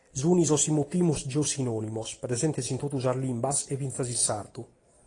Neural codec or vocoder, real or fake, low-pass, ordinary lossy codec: none; real; 10.8 kHz; AAC, 32 kbps